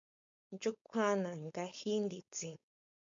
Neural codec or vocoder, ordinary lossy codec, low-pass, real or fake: codec, 16 kHz, 4.8 kbps, FACodec; MP3, 64 kbps; 7.2 kHz; fake